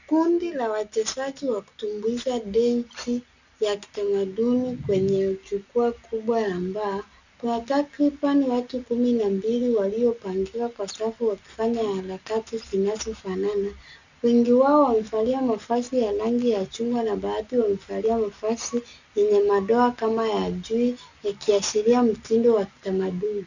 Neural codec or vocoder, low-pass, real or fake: none; 7.2 kHz; real